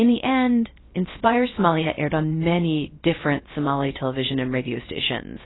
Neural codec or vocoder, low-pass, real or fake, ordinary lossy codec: codec, 16 kHz, 0.3 kbps, FocalCodec; 7.2 kHz; fake; AAC, 16 kbps